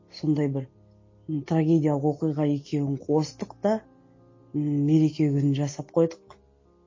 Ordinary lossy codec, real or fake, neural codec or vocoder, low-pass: MP3, 32 kbps; real; none; 7.2 kHz